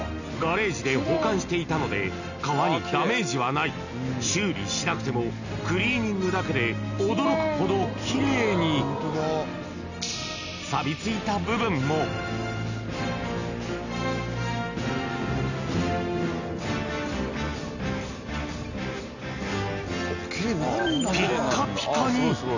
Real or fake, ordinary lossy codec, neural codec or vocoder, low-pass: real; none; none; 7.2 kHz